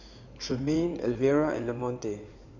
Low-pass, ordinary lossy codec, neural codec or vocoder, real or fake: 7.2 kHz; none; codec, 16 kHz in and 24 kHz out, 2.2 kbps, FireRedTTS-2 codec; fake